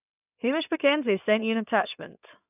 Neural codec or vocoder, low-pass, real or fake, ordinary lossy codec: codec, 16 kHz in and 24 kHz out, 2.2 kbps, FireRedTTS-2 codec; 3.6 kHz; fake; none